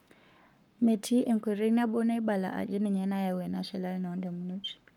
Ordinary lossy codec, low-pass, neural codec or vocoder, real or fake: none; 19.8 kHz; codec, 44.1 kHz, 7.8 kbps, Pupu-Codec; fake